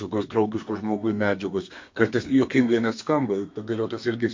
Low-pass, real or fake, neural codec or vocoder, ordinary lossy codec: 7.2 kHz; fake; codec, 32 kHz, 1.9 kbps, SNAC; MP3, 48 kbps